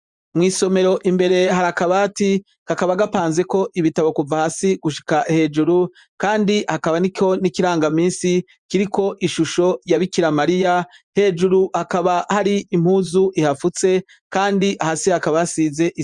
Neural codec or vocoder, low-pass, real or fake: vocoder, 24 kHz, 100 mel bands, Vocos; 10.8 kHz; fake